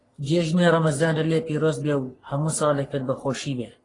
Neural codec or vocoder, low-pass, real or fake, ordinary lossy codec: codec, 44.1 kHz, 3.4 kbps, Pupu-Codec; 10.8 kHz; fake; AAC, 32 kbps